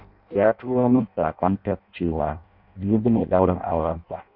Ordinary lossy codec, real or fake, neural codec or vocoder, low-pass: none; fake; codec, 16 kHz in and 24 kHz out, 0.6 kbps, FireRedTTS-2 codec; 5.4 kHz